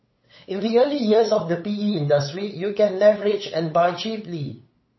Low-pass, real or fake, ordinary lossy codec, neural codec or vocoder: 7.2 kHz; fake; MP3, 24 kbps; codec, 16 kHz, 8 kbps, FunCodec, trained on LibriTTS, 25 frames a second